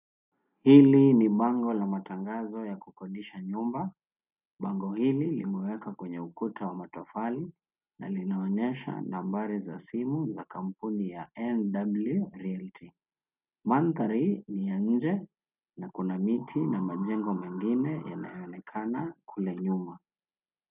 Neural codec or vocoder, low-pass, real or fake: none; 3.6 kHz; real